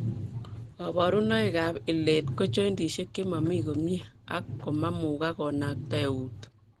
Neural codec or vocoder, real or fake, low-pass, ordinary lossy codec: none; real; 14.4 kHz; Opus, 16 kbps